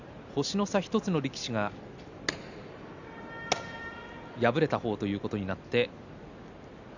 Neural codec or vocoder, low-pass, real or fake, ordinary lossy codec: none; 7.2 kHz; real; none